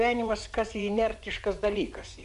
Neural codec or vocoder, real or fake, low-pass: vocoder, 24 kHz, 100 mel bands, Vocos; fake; 10.8 kHz